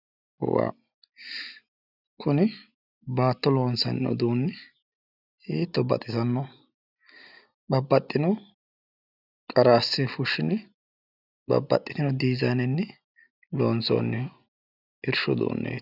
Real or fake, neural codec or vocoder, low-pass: real; none; 5.4 kHz